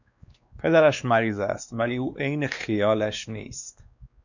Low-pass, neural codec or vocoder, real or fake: 7.2 kHz; codec, 16 kHz, 2 kbps, X-Codec, WavLM features, trained on Multilingual LibriSpeech; fake